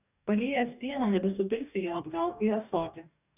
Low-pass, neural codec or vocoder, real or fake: 3.6 kHz; codec, 44.1 kHz, 2.6 kbps, DAC; fake